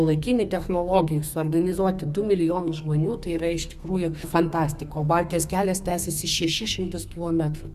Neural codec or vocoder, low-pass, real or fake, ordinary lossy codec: codec, 32 kHz, 1.9 kbps, SNAC; 14.4 kHz; fake; Opus, 64 kbps